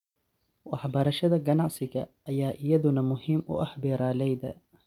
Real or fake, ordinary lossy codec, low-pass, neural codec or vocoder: real; none; 19.8 kHz; none